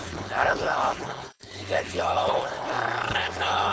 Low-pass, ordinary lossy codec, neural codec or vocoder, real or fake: none; none; codec, 16 kHz, 4.8 kbps, FACodec; fake